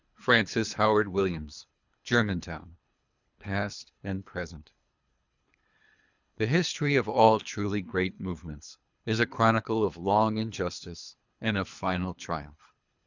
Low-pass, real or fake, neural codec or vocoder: 7.2 kHz; fake; codec, 24 kHz, 3 kbps, HILCodec